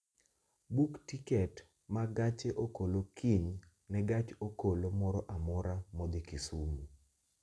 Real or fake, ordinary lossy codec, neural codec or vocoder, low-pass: real; none; none; none